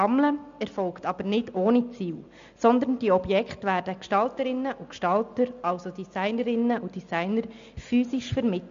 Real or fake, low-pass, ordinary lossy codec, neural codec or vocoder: real; 7.2 kHz; MP3, 64 kbps; none